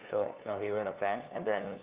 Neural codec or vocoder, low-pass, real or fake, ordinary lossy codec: codec, 16 kHz, 2 kbps, FunCodec, trained on LibriTTS, 25 frames a second; 3.6 kHz; fake; Opus, 24 kbps